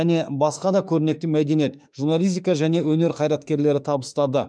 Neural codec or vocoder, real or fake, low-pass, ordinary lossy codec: autoencoder, 48 kHz, 32 numbers a frame, DAC-VAE, trained on Japanese speech; fake; 9.9 kHz; none